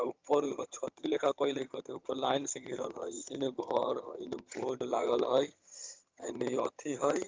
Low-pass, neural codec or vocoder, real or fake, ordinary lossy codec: 7.2 kHz; vocoder, 22.05 kHz, 80 mel bands, HiFi-GAN; fake; Opus, 24 kbps